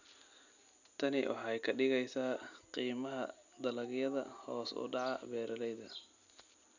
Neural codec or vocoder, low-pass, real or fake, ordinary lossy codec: none; 7.2 kHz; real; none